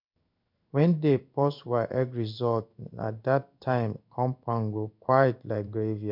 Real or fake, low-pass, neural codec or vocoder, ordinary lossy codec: fake; 5.4 kHz; codec, 16 kHz in and 24 kHz out, 1 kbps, XY-Tokenizer; none